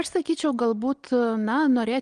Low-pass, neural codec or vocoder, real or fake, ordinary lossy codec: 9.9 kHz; none; real; Opus, 24 kbps